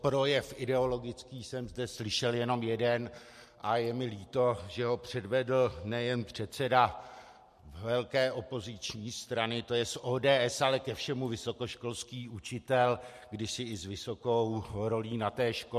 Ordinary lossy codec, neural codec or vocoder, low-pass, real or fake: MP3, 64 kbps; none; 14.4 kHz; real